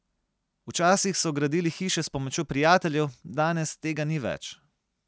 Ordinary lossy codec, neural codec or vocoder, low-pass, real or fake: none; none; none; real